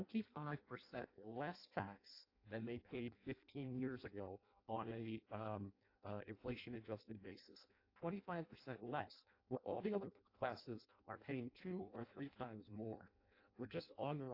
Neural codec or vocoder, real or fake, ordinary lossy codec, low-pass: codec, 16 kHz in and 24 kHz out, 0.6 kbps, FireRedTTS-2 codec; fake; AAC, 32 kbps; 5.4 kHz